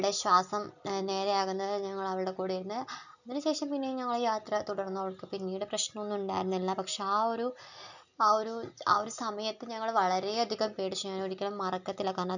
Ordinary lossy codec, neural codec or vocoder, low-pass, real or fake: none; none; 7.2 kHz; real